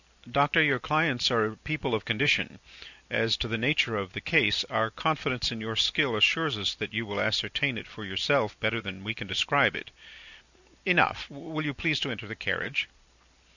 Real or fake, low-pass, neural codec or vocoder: real; 7.2 kHz; none